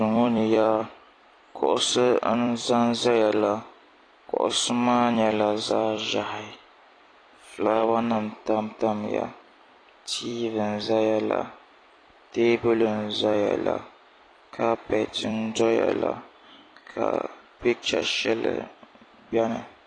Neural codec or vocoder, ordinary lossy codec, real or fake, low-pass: vocoder, 44.1 kHz, 128 mel bands every 256 samples, BigVGAN v2; AAC, 32 kbps; fake; 9.9 kHz